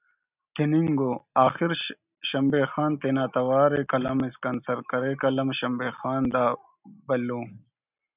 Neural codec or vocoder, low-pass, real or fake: none; 3.6 kHz; real